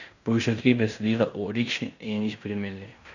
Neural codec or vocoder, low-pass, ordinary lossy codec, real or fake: codec, 16 kHz in and 24 kHz out, 0.9 kbps, LongCat-Audio-Codec, fine tuned four codebook decoder; 7.2 kHz; none; fake